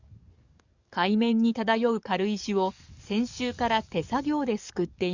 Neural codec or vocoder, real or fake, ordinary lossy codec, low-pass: codec, 44.1 kHz, 7.8 kbps, DAC; fake; Opus, 64 kbps; 7.2 kHz